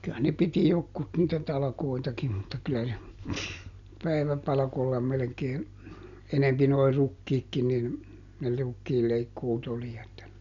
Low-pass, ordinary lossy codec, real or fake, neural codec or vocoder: 7.2 kHz; none; real; none